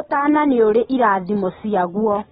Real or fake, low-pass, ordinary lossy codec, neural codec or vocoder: real; 7.2 kHz; AAC, 16 kbps; none